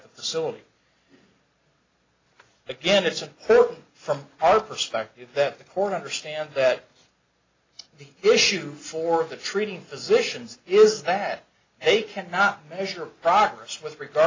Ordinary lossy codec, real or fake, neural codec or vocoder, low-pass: AAC, 32 kbps; real; none; 7.2 kHz